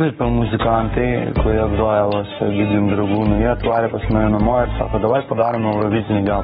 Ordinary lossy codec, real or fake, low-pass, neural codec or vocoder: AAC, 16 kbps; fake; 19.8 kHz; codec, 44.1 kHz, 7.8 kbps, Pupu-Codec